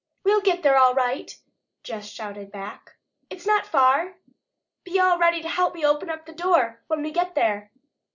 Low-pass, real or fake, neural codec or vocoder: 7.2 kHz; real; none